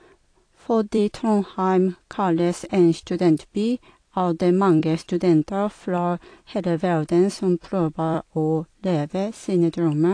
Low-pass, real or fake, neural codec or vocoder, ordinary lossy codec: 9.9 kHz; fake; vocoder, 44.1 kHz, 128 mel bands every 256 samples, BigVGAN v2; AAC, 48 kbps